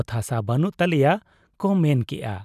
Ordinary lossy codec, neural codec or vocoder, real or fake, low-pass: none; vocoder, 44.1 kHz, 128 mel bands every 256 samples, BigVGAN v2; fake; 14.4 kHz